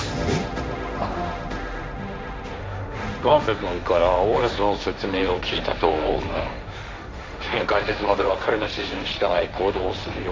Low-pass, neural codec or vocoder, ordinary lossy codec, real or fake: none; codec, 16 kHz, 1.1 kbps, Voila-Tokenizer; none; fake